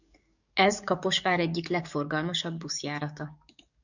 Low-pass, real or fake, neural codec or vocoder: 7.2 kHz; fake; codec, 44.1 kHz, 7.8 kbps, DAC